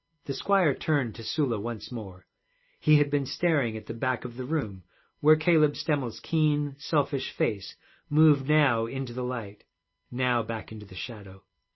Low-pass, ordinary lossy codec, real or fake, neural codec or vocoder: 7.2 kHz; MP3, 24 kbps; real; none